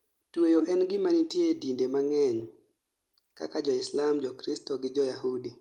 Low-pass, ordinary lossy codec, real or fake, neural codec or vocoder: 19.8 kHz; Opus, 32 kbps; real; none